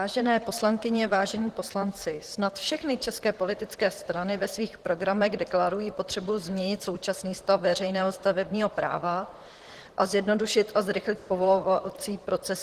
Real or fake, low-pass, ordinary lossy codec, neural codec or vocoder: fake; 14.4 kHz; Opus, 16 kbps; vocoder, 44.1 kHz, 128 mel bands, Pupu-Vocoder